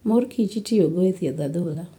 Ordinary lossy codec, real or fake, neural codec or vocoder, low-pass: none; fake; vocoder, 44.1 kHz, 128 mel bands every 256 samples, BigVGAN v2; 19.8 kHz